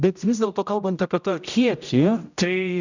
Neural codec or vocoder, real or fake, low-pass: codec, 16 kHz, 0.5 kbps, X-Codec, HuBERT features, trained on general audio; fake; 7.2 kHz